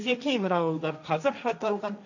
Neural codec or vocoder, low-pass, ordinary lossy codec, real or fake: codec, 16 kHz, 1.1 kbps, Voila-Tokenizer; 7.2 kHz; none; fake